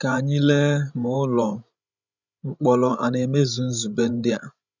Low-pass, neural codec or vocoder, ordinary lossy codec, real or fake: 7.2 kHz; codec, 16 kHz, 16 kbps, FreqCodec, larger model; none; fake